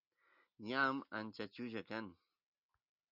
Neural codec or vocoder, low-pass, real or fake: none; 5.4 kHz; real